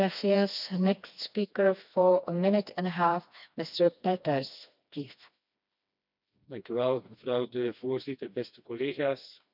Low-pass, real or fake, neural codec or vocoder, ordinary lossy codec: 5.4 kHz; fake; codec, 16 kHz, 2 kbps, FreqCodec, smaller model; none